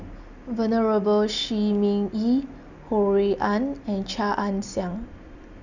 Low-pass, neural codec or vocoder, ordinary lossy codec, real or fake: 7.2 kHz; none; none; real